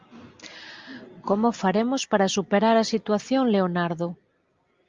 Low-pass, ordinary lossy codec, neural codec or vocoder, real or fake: 7.2 kHz; Opus, 32 kbps; none; real